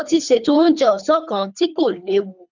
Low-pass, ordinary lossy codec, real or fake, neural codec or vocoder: 7.2 kHz; none; fake; codec, 24 kHz, 3 kbps, HILCodec